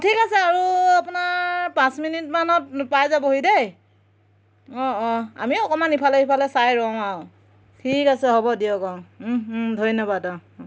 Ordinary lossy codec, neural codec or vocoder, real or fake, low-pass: none; none; real; none